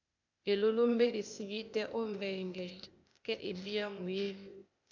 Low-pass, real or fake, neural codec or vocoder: 7.2 kHz; fake; codec, 16 kHz, 0.8 kbps, ZipCodec